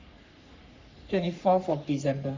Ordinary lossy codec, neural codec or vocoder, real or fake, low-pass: MP3, 64 kbps; codec, 44.1 kHz, 3.4 kbps, Pupu-Codec; fake; 7.2 kHz